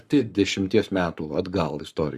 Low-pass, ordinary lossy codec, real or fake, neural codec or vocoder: 14.4 kHz; Opus, 64 kbps; fake; codec, 44.1 kHz, 7.8 kbps, Pupu-Codec